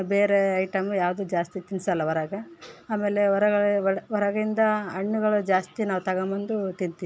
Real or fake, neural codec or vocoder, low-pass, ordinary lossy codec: real; none; none; none